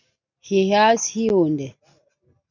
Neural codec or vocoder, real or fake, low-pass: none; real; 7.2 kHz